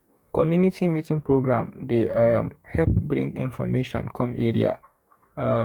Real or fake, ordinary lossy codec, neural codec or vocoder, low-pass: fake; none; codec, 44.1 kHz, 2.6 kbps, DAC; 19.8 kHz